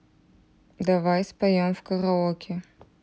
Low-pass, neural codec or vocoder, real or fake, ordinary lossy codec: none; none; real; none